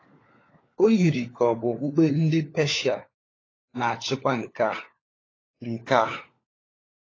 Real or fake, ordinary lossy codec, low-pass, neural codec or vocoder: fake; AAC, 32 kbps; 7.2 kHz; codec, 16 kHz, 4 kbps, FunCodec, trained on LibriTTS, 50 frames a second